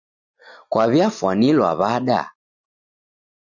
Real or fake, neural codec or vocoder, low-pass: real; none; 7.2 kHz